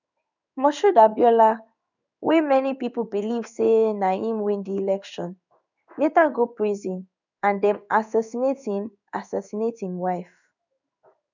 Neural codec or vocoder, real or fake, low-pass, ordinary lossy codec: codec, 16 kHz in and 24 kHz out, 1 kbps, XY-Tokenizer; fake; 7.2 kHz; none